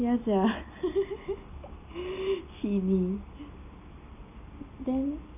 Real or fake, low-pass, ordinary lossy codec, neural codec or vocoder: real; 3.6 kHz; none; none